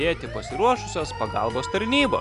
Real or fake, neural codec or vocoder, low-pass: real; none; 10.8 kHz